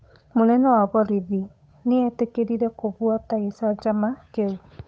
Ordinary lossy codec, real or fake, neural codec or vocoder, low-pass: none; fake; codec, 16 kHz, 8 kbps, FunCodec, trained on Chinese and English, 25 frames a second; none